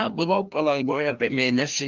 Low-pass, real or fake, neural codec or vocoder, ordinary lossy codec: 7.2 kHz; fake; codec, 16 kHz, 1 kbps, FreqCodec, larger model; Opus, 24 kbps